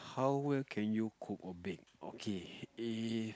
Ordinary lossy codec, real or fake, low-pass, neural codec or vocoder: none; real; none; none